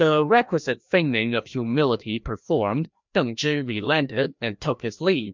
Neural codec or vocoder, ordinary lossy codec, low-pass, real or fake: codec, 16 kHz, 1 kbps, FreqCodec, larger model; MP3, 64 kbps; 7.2 kHz; fake